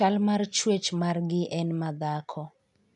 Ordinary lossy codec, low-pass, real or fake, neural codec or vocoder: none; 10.8 kHz; real; none